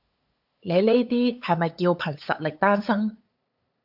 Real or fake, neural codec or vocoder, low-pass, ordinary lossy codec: fake; codec, 16 kHz, 8 kbps, FunCodec, trained on LibriTTS, 25 frames a second; 5.4 kHz; AAC, 48 kbps